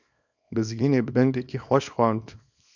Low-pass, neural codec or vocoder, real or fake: 7.2 kHz; codec, 24 kHz, 0.9 kbps, WavTokenizer, small release; fake